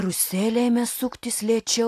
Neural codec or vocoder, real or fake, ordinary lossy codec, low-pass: none; real; AAC, 64 kbps; 14.4 kHz